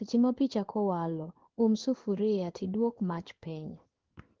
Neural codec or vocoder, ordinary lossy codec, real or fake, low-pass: codec, 16 kHz in and 24 kHz out, 1 kbps, XY-Tokenizer; Opus, 16 kbps; fake; 7.2 kHz